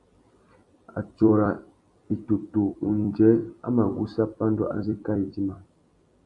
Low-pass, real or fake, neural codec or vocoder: 10.8 kHz; fake; vocoder, 44.1 kHz, 128 mel bands every 256 samples, BigVGAN v2